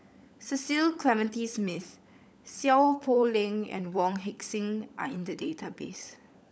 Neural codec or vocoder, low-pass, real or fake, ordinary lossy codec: codec, 16 kHz, 16 kbps, FunCodec, trained on LibriTTS, 50 frames a second; none; fake; none